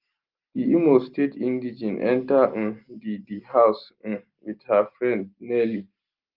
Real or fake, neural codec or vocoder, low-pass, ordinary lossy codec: real; none; 5.4 kHz; Opus, 32 kbps